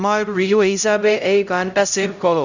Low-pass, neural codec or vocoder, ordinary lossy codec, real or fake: 7.2 kHz; codec, 16 kHz, 0.5 kbps, X-Codec, HuBERT features, trained on LibriSpeech; none; fake